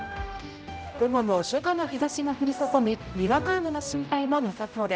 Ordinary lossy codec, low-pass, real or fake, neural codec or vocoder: none; none; fake; codec, 16 kHz, 0.5 kbps, X-Codec, HuBERT features, trained on general audio